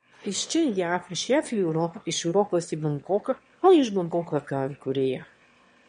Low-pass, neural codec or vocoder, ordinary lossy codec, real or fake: 9.9 kHz; autoencoder, 22.05 kHz, a latent of 192 numbers a frame, VITS, trained on one speaker; MP3, 48 kbps; fake